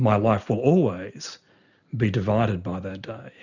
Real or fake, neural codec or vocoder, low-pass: real; none; 7.2 kHz